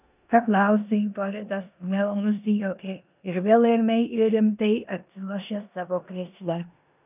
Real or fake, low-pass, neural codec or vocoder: fake; 3.6 kHz; codec, 16 kHz in and 24 kHz out, 0.9 kbps, LongCat-Audio-Codec, four codebook decoder